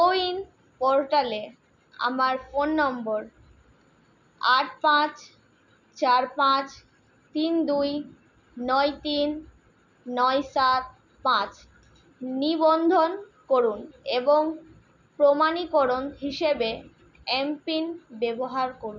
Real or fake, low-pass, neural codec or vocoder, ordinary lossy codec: real; 7.2 kHz; none; none